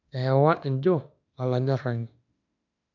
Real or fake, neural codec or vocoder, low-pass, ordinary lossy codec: fake; autoencoder, 48 kHz, 32 numbers a frame, DAC-VAE, trained on Japanese speech; 7.2 kHz; none